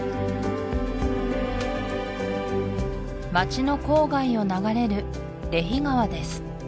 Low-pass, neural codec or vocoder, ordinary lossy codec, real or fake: none; none; none; real